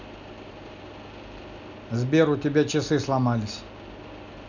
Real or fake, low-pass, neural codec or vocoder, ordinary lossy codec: real; 7.2 kHz; none; none